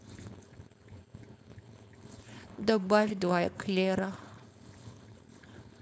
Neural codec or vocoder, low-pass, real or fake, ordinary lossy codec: codec, 16 kHz, 4.8 kbps, FACodec; none; fake; none